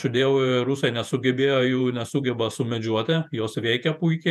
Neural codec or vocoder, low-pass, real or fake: vocoder, 48 kHz, 128 mel bands, Vocos; 14.4 kHz; fake